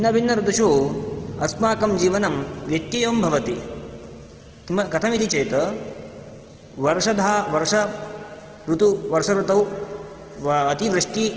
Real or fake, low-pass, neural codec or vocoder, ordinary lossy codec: real; 7.2 kHz; none; Opus, 16 kbps